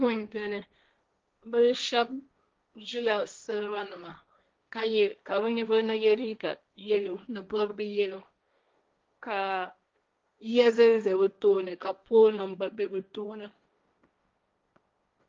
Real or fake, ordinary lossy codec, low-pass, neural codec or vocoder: fake; Opus, 32 kbps; 7.2 kHz; codec, 16 kHz, 1.1 kbps, Voila-Tokenizer